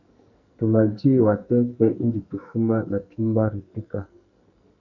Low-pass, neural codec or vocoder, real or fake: 7.2 kHz; codec, 44.1 kHz, 2.6 kbps, SNAC; fake